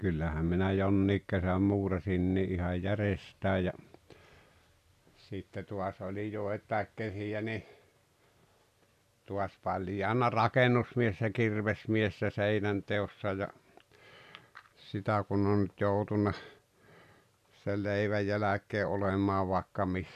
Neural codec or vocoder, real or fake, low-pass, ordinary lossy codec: none; real; 14.4 kHz; none